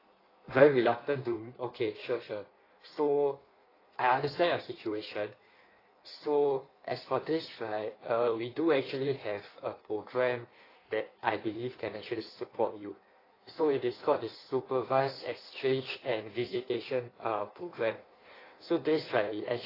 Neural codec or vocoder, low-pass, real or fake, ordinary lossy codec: codec, 16 kHz in and 24 kHz out, 1.1 kbps, FireRedTTS-2 codec; 5.4 kHz; fake; AAC, 24 kbps